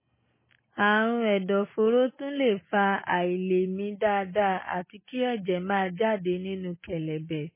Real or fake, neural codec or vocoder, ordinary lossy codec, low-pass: real; none; MP3, 16 kbps; 3.6 kHz